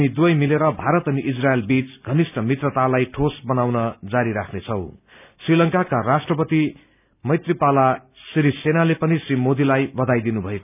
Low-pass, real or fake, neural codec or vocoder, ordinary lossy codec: 3.6 kHz; real; none; none